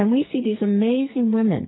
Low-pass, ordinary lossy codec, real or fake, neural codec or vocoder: 7.2 kHz; AAC, 16 kbps; fake; codec, 44.1 kHz, 2.6 kbps, SNAC